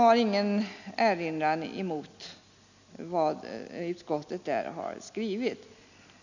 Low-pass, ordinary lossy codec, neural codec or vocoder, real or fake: 7.2 kHz; none; none; real